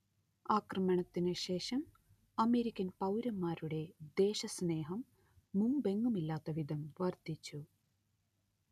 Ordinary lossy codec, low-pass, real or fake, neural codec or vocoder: none; 14.4 kHz; real; none